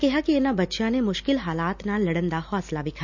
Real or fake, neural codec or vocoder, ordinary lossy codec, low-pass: real; none; none; 7.2 kHz